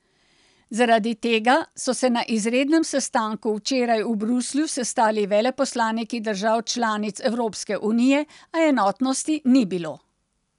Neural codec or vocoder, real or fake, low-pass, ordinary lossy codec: none; real; 10.8 kHz; none